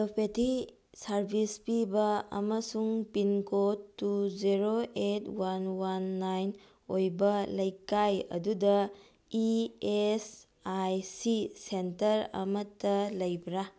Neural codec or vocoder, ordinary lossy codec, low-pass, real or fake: none; none; none; real